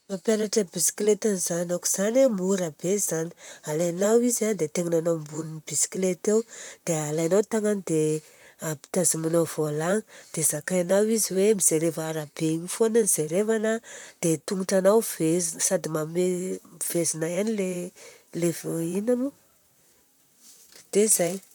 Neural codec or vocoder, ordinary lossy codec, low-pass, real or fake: vocoder, 44.1 kHz, 128 mel bands, Pupu-Vocoder; none; none; fake